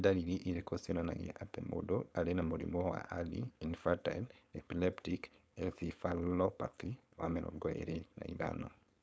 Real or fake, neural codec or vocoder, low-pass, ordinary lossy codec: fake; codec, 16 kHz, 4.8 kbps, FACodec; none; none